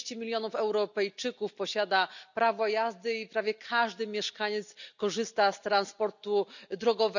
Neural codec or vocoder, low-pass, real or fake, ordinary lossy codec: none; 7.2 kHz; real; none